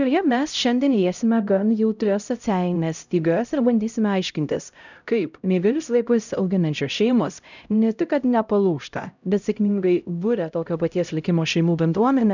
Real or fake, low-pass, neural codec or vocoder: fake; 7.2 kHz; codec, 16 kHz, 0.5 kbps, X-Codec, HuBERT features, trained on LibriSpeech